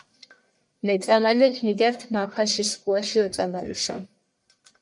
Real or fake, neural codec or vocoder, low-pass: fake; codec, 44.1 kHz, 1.7 kbps, Pupu-Codec; 10.8 kHz